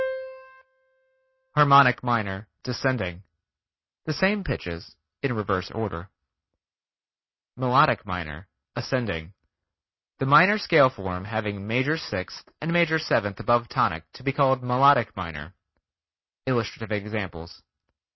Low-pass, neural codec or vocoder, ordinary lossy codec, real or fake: 7.2 kHz; none; MP3, 24 kbps; real